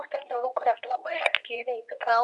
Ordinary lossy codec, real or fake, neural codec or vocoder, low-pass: MP3, 96 kbps; fake; codec, 24 kHz, 0.9 kbps, WavTokenizer, medium speech release version 2; 10.8 kHz